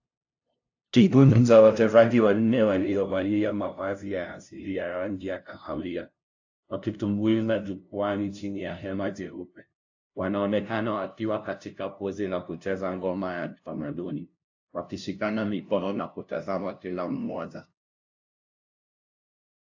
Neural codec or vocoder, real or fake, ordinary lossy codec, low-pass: codec, 16 kHz, 0.5 kbps, FunCodec, trained on LibriTTS, 25 frames a second; fake; AAC, 48 kbps; 7.2 kHz